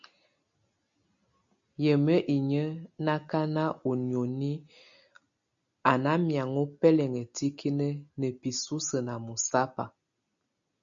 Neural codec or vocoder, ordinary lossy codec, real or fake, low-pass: none; MP3, 64 kbps; real; 7.2 kHz